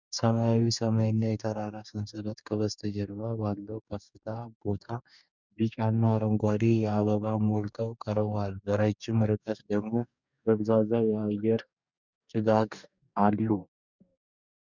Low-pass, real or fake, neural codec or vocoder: 7.2 kHz; fake; codec, 44.1 kHz, 2.6 kbps, DAC